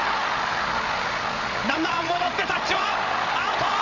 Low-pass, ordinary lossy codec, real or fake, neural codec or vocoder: 7.2 kHz; none; fake; codec, 16 kHz, 8 kbps, FreqCodec, larger model